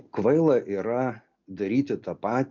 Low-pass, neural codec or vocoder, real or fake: 7.2 kHz; none; real